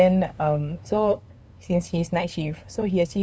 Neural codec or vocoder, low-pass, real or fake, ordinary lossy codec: codec, 16 kHz, 2 kbps, FunCodec, trained on LibriTTS, 25 frames a second; none; fake; none